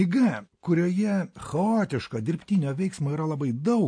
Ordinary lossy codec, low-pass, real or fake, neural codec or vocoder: MP3, 48 kbps; 9.9 kHz; real; none